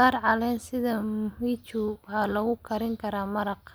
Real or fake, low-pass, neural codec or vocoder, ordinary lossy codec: real; none; none; none